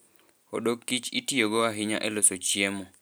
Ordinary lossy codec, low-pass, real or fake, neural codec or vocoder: none; none; real; none